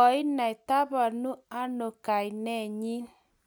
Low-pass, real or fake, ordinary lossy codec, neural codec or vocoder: none; real; none; none